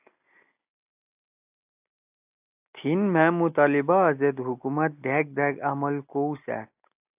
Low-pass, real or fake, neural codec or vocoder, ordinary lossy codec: 3.6 kHz; real; none; AAC, 32 kbps